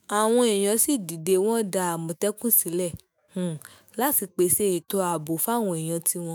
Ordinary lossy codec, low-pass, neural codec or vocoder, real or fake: none; none; autoencoder, 48 kHz, 128 numbers a frame, DAC-VAE, trained on Japanese speech; fake